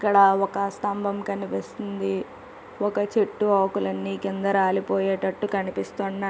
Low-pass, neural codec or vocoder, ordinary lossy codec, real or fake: none; none; none; real